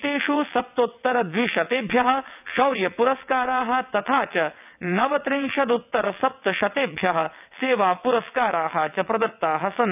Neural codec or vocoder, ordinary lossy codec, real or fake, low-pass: vocoder, 22.05 kHz, 80 mel bands, WaveNeXt; none; fake; 3.6 kHz